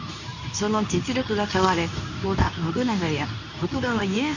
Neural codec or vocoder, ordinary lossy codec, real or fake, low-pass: codec, 24 kHz, 0.9 kbps, WavTokenizer, medium speech release version 2; none; fake; 7.2 kHz